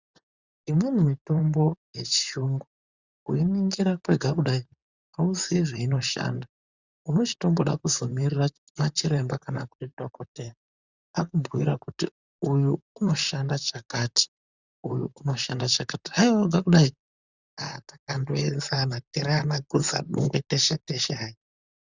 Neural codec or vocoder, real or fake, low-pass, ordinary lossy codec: vocoder, 44.1 kHz, 128 mel bands, Pupu-Vocoder; fake; 7.2 kHz; Opus, 64 kbps